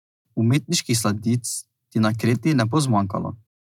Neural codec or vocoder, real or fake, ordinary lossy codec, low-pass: none; real; none; 19.8 kHz